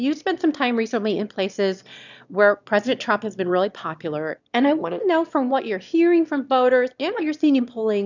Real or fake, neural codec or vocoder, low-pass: fake; autoencoder, 22.05 kHz, a latent of 192 numbers a frame, VITS, trained on one speaker; 7.2 kHz